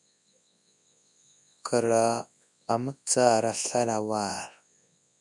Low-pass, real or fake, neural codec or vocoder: 10.8 kHz; fake; codec, 24 kHz, 0.9 kbps, WavTokenizer, large speech release